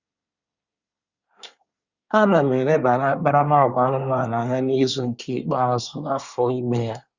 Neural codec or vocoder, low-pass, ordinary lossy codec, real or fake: codec, 24 kHz, 1 kbps, SNAC; 7.2 kHz; Opus, 64 kbps; fake